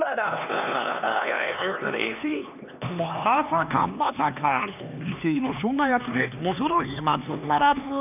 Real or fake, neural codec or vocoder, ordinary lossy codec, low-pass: fake; codec, 16 kHz, 2 kbps, X-Codec, HuBERT features, trained on LibriSpeech; none; 3.6 kHz